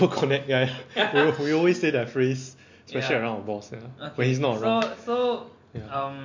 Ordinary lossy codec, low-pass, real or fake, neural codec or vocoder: MP3, 48 kbps; 7.2 kHz; real; none